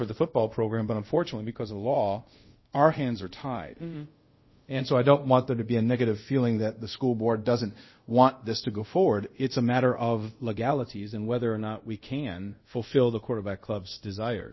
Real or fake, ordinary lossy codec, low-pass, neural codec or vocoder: fake; MP3, 24 kbps; 7.2 kHz; codec, 24 kHz, 0.5 kbps, DualCodec